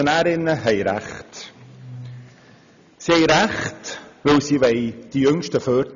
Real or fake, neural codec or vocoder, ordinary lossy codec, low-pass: real; none; none; 7.2 kHz